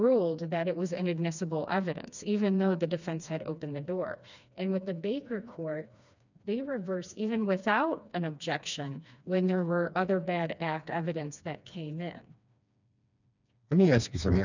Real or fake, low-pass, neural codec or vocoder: fake; 7.2 kHz; codec, 16 kHz, 2 kbps, FreqCodec, smaller model